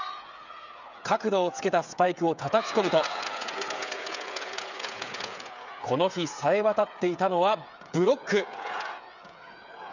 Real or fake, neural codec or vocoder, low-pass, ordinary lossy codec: fake; codec, 16 kHz, 8 kbps, FreqCodec, smaller model; 7.2 kHz; none